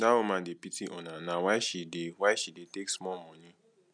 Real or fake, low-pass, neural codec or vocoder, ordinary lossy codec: real; 9.9 kHz; none; none